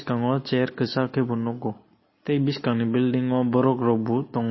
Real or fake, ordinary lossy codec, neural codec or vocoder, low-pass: real; MP3, 24 kbps; none; 7.2 kHz